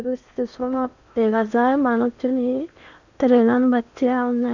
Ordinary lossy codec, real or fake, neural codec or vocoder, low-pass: none; fake; codec, 16 kHz in and 24 kHz out, 0.8 kbps, FocalCodec, streaming, 65536 codes; 7.2 kHz